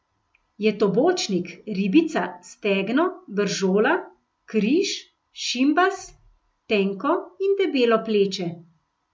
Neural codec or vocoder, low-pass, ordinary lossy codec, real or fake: none; none; none; real